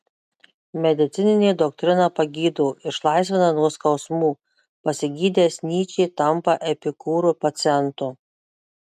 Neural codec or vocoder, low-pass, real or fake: none; 14.4 kHz; real